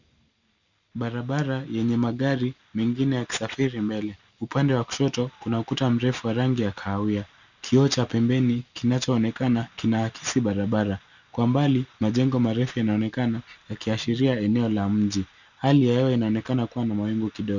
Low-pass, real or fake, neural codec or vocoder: 7.2 kHz; real; none